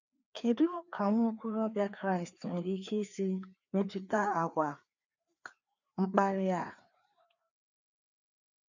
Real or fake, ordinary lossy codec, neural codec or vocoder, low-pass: fake; none; codec, 16 kHz, 2 kbps, FreqCodec, larger model; 7.2 kHz